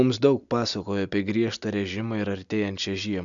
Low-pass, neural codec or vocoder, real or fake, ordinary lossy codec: 7.2 kHz; none; real; MP3, 96 kbps